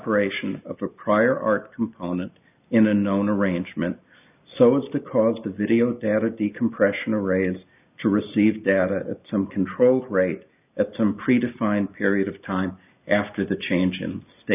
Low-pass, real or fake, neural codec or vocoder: 3.6 kHz; real; none